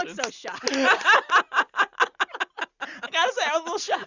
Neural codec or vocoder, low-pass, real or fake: none; 7.2 kHz; real